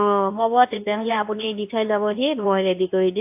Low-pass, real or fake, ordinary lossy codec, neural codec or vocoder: 3.6 kHz; fake; AAC, 32 kbps; codec, 24 kHz, 0.9 kbps, WavTokenizer, medium speech release version 2